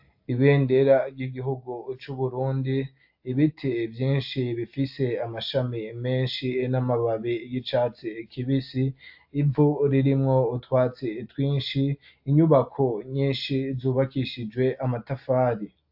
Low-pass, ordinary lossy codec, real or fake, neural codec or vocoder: 5.4 kHz; AAC, 48 kbps; real; none